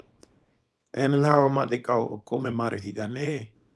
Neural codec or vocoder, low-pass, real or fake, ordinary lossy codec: codec, 24 kHz, 0.9 kbps, WavTokenizer, small release; none; fake; none